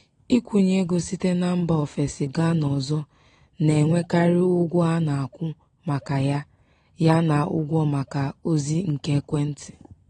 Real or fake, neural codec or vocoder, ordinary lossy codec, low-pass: real; none; AAC, 32 kbps; 9.9 kHz